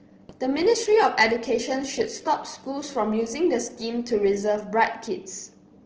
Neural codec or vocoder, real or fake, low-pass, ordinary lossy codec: none; real; 7.2 kHz; Opus, 16 kbps